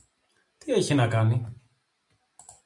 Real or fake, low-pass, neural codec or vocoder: real; 10.8 kHz; none